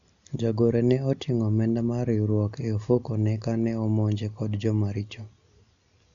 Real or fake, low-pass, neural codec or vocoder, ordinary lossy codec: real; 7.2 kHz; none; none